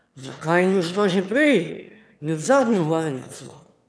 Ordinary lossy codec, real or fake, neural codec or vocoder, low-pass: none; fake; autoencoder, 22.05 kHz, a latent of 192 numbers a frame, VITS, trained on one speaker; none